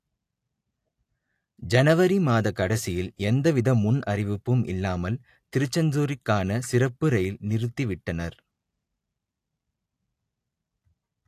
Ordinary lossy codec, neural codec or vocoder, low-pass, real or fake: AAC, 64 kbps; none; 14.4 kHz; real